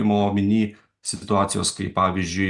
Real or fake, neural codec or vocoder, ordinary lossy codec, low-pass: real; none; Opus, 64 kbps; 10.8 kHz